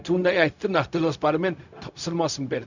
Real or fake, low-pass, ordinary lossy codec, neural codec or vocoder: fake; 7.2 kHz; none; codec, 16 kHz, 0.4 kbps, LongCat-Audio-Codec